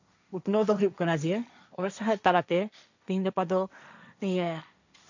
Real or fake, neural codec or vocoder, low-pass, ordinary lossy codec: fake; codec, 16 kHz, 1.1 kbps, Voila-Tokenizer; 7.2 kHz; none